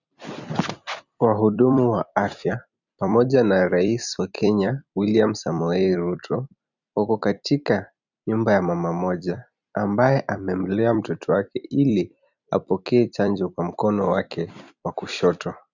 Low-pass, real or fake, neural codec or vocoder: 7.2 kHz; fake; vocoder, 44.1 kHz, 128 mel bands every 256 samples, BigVGAN v2